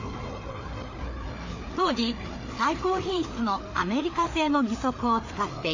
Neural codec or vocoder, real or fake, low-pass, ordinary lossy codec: codec, 16 kHz, 4 kbps, FreqCodec, larger model; fake; 7.2 kHz; AAC, 48 kbps